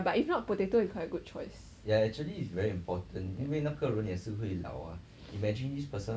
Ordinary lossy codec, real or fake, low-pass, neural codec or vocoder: none; real; none; none